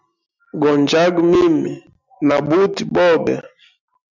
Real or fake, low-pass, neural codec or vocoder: real; 7.2 kHz; none